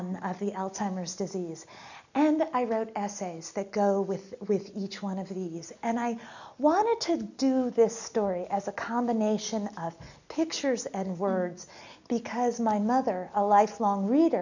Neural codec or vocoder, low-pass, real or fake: none; 7.2 kHz; real